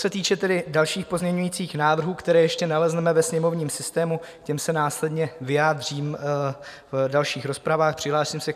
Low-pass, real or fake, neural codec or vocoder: 14.4 kHz; fake; vocoder, 44.1 kHz, 128 mel bands every 512 samples, BigVGAN v2